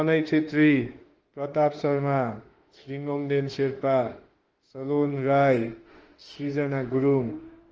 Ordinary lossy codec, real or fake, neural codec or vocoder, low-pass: Opus, 16 kbps; fake; autoencoder, 48 kHz, 32 numbers a frame, DAC-VAE, trained on Japanese speech; 7.2 kHz